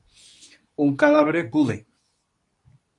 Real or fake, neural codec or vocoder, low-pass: fake; codec, 24 kHz, 0.9 kbps, WavTokenizer, medium speech release version 2; 10.8 kHz